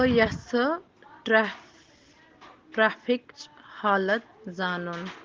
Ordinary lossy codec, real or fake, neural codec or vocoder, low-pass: Opus, 16 kbps; real; none; 7.2 kHz